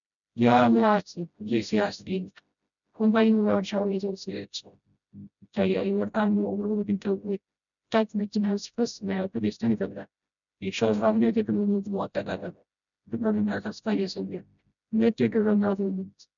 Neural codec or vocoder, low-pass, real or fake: codec, 16 kHz, 0.5 kbps, FreqCodec, smaller model; 7.2 kHz; fake